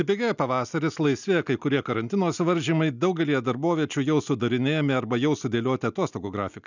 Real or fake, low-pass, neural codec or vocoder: real; 7.2 kHz; none